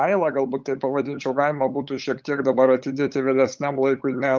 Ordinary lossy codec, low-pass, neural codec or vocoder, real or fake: Opus, 24 kbps; 7.2 kHz; codec, 16 kHz, 8 kbps, FunCodec, trained on LibriTTS, 25 frames a second; fake